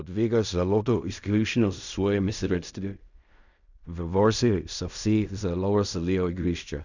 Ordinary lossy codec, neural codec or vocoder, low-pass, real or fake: none; codec, 16 kHz in and 24 kHz out, 0.4 kbps, LongCat-Audio-Codec, fine tuned four codebook decoder; 7.2 kHz; fake